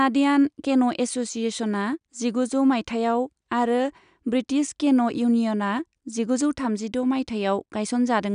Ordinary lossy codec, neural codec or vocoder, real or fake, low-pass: none; none; real; 9.9 kHz